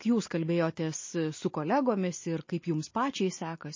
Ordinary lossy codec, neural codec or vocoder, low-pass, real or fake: MP3, 32 kbps; none; 7.2 kHz; real